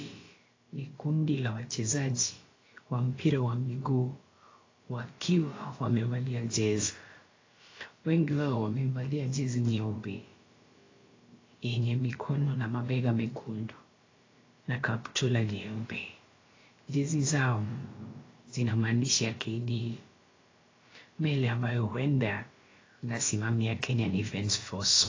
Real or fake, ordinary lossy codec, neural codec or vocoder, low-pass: fake; AAC, 32 kbps; codec, 16 kHz, about 1 kbps, DyCAST, with the encoder's durations; 7.2 kHz